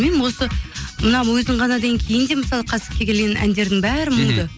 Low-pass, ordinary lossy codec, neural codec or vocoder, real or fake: none; none; none; real